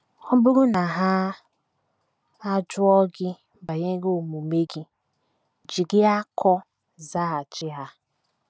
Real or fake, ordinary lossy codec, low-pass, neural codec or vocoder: real; none; none; none